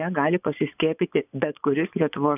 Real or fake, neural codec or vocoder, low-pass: fake; vocoder, 24 kHz, 100 mel bands, Vocos; 3.6 kHz